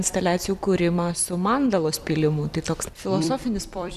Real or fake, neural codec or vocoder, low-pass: fake; vocoder, 44.1 kHz, 128 mel bands every 256 samples, BigVGAN v2; 14.4 kHz